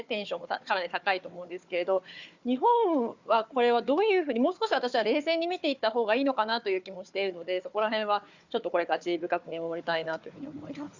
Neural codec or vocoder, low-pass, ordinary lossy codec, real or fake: codec, 16 kHz, 4 kbps, FunCodec, trained on Chinese and English, 50 frames a second; 7.2 kHz; Opus, 64 kbps; fake